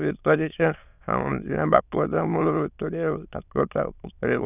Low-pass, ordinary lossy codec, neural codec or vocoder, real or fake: 3.6 kHz; none; autoencoder, 22.05 kHz, a latent of 192 numbers a frame, VITS, trained on many speakers; fake